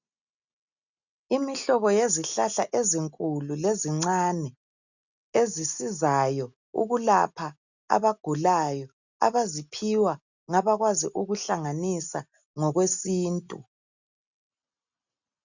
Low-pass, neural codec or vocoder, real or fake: 7.2 kHz; none; real